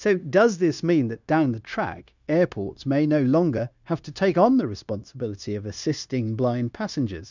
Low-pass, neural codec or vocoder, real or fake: 7.2 kHz; codec, 16 kHz, 0.9 kbps, LongCat-Audio-Codec; fake